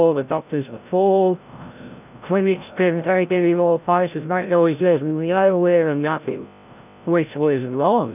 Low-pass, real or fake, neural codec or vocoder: 3.6 kHz; fake; codec, 16 kHz, 0.5 kbps, FreqCodec, larger model